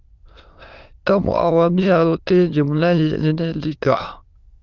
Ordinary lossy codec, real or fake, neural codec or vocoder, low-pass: Opus, 32 kbps; fake; autoencoder, 22.05 kHz, a latent of 192 numbers a frame, VITS, trained on many speakers; 7.2 kHz